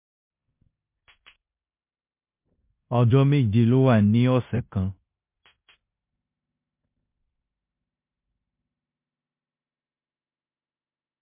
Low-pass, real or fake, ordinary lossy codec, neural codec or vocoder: 3.6 kHz; fake; MP3, 32 kbps; codec, 16 kHz in and 24 kHz out, 0.9 kbps, LongCat-Audio-Codec, four codebook decoder